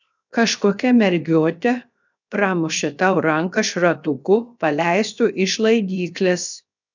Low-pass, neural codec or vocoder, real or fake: 7.2 kHz; codec, 16 kHz, 0.7 kbps, FocalCodec; fake